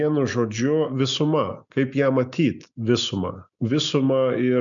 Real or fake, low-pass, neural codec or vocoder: real; 7.2 kHz; none